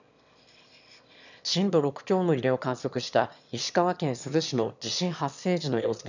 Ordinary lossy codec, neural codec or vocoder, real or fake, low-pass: none; autoencoder, 22.05 kHz, a latent of 192 numbers a frame, VITS, trained on one speaker; fake; 7.2 kHz